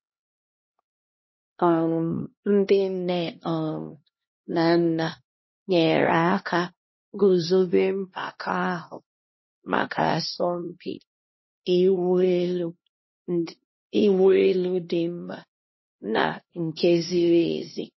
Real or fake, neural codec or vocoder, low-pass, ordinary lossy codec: fake; codec, 16 kHz, 1 kbps, X-Codec, HuBERT features, trained on LibriSpeech; 7.2 kHz; MP3, 24 kbps